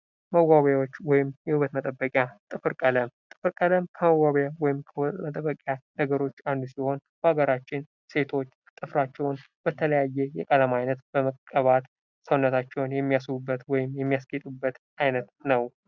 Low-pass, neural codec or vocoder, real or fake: 7.2 kHz; none; real